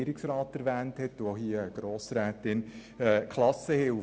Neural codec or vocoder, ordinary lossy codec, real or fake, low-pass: none; none; real; none